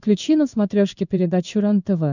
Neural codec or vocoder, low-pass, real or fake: codec, 16 kHz in and 24 kHz out, 1 kbps, XY-Tokenizer; 7.2 kHz; fake